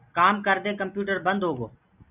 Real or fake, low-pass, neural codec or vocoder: real; 3.6 kHz; none